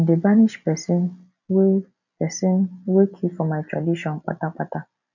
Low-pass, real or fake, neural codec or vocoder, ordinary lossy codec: 7.2 kHz; real; none; none